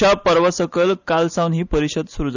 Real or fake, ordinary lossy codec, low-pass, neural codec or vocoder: real; none; 7.2 kHz; none